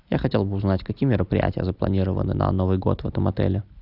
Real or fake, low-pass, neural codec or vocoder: real; 5.4 kHz; none